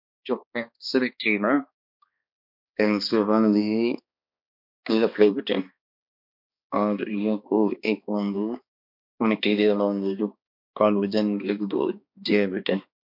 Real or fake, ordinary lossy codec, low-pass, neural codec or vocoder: fake; MP3, 48 kbps; 5.4 kHz; codec, 16 kHz, 2 kbps, X-Codec, HuBERT features, trained on balanced general audio